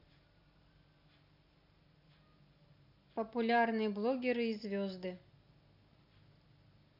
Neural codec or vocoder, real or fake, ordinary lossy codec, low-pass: none; real; none; 5.4 kHz